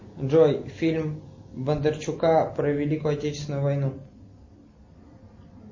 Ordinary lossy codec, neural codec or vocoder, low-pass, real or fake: MP3, 32 kbps; none; 7.2 kHz; real